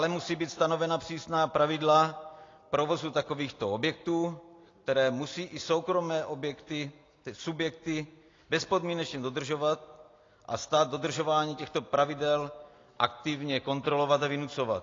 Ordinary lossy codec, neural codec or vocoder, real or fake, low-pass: AAC, 32 kbps; none; real; 7.2 kHz